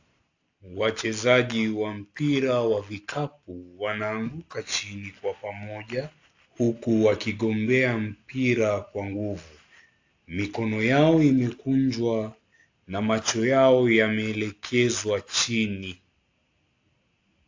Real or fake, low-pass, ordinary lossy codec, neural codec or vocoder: real; 7.2 kHz; AAC, 48 kbps; none